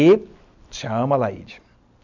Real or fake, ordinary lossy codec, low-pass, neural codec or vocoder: real; none; 7.2 kHz; none